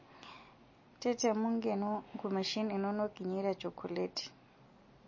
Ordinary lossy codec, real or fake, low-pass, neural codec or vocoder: MP3, 32 kbps; real; 7.2 kHz; none